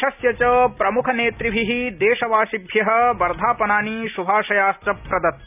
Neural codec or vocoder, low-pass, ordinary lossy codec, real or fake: none; 3.6 kHz; none; real